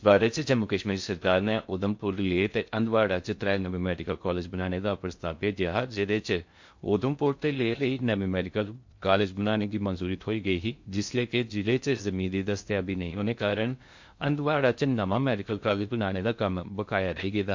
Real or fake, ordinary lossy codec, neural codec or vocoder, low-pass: fake; MP3, 48 kbps; codec, 16 kHz in and 24 kHz out, 0.6 kbps, FocalCodec, streaming, 4096 codes; 7.2 kHz